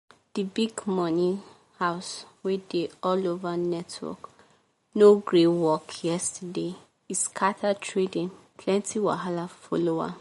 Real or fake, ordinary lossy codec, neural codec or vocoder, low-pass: real; MP3, 48 kbps; none; 19.8 kHz